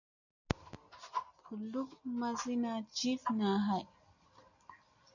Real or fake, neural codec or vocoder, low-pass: real; none; 7.2 kHz